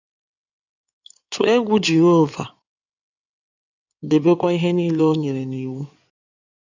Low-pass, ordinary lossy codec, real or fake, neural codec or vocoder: 7.2 kHz; none; fake; codec, 16 kHz in and 24 kHz out, 2.2 kbps, FireRedTTS-2 codec